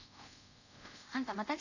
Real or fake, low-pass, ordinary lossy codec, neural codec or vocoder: fake; 7.2 kHz; none; codec, 24 kHz, 0.5 kbps, DualCodec